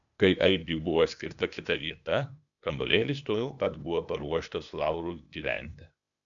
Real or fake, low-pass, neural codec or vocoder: fake; 7.2 kHz; codec, 16 kHz, 0.8 kbps, ZipCodec